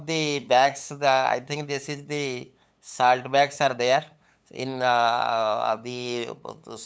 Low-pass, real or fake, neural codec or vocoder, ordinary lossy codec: none; fake; codec, 16 kHz, 8 kbps, FunCodec, trained on LibriTTS, 25 frames a second; none